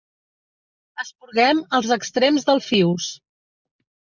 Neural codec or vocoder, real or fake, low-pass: none; real; 7.2 kHz